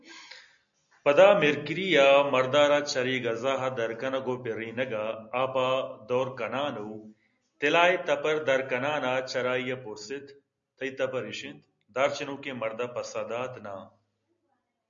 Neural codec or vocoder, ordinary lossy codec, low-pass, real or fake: none; MP3, 64 kbps; 7.2 kHz; real